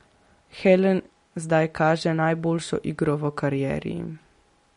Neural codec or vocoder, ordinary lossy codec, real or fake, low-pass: none; MP3, 48 kbps; real; 10.8 kHz